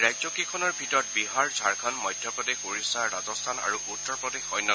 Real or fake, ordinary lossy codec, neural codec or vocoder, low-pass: real; none; none; none